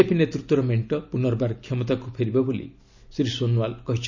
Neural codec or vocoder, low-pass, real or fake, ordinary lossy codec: none; 7.2 kHz; real; none